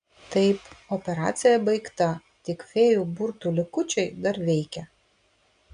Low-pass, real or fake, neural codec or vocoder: 9.9 kHz; real; none